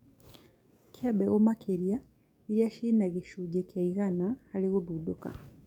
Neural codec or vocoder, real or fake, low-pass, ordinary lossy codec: codec, 44.1 kHz, 7.8 kbps, DAC; fake; 19.8 kHz; none